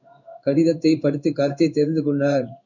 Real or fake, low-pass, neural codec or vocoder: fake; 7.2 kHz; codec, 16 kHz in and 24 kHz out, 1 kbps, XY-Tokenizer